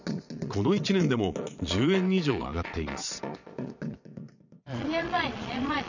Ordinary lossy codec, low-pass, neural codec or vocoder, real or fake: none; 7.2 kHz; vocoder, 22.05 kHz, 80 mel bands, Vocos; fake